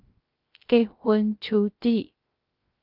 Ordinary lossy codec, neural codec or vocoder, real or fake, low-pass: Opus, 32 kbps; codec, 16 kHz, 0.3 kbps, FocalCodec; fake; 5.4 kHz